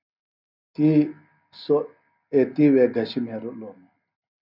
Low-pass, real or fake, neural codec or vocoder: 5.4 kHz; real; none